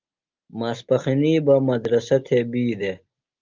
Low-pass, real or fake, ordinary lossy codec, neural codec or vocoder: 7.2 kHz; real; Opus, 32 kbps; none